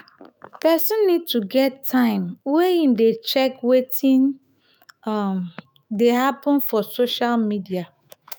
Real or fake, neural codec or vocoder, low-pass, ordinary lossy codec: fake; autoencoder, 48 kHz, 128 numbers a frame, DAC-VAE, trained on Japanese speech; none; none